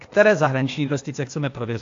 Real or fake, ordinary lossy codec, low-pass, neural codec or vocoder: fake; AAC, 48 kbps; 7.2 kHz; codec, 16 kHz, 0.8 kbps, ZipCodec